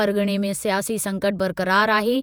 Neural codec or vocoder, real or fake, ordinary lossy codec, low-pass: vocoder, 48 kHz, 128 mel bands, Vocos; fake; none; none